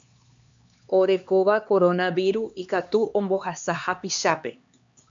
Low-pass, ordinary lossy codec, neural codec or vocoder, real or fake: 7.2 kHz; MP3, 64 kbps; codec, 16 kHz, 2 kbps, X-Codec, HuBERT features, trained on LibriSpeech; fake